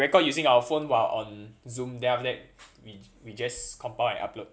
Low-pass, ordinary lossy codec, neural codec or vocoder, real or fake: none; none; none; real